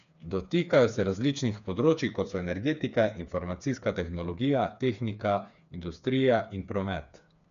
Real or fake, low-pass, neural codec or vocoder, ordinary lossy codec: fake; 7.2 kHz; codec, 16 kHz, 4 kbps, FreqCodec, smaller model; none